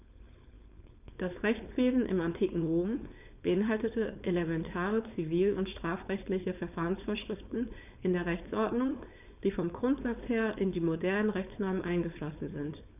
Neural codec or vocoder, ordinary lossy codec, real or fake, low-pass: codec, 16 kHz, 4.8 kbps, FACodec; none; fake; 3.6 kHz